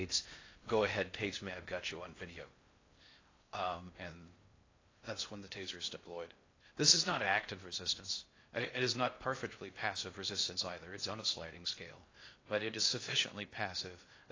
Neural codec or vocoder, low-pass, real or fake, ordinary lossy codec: codec, 16 kHz in and 24 kHz out, 0.6 kbps, FocalCodec, streaming, 4096 codes; 7.2 kHz; fake; AAC, 32 kbps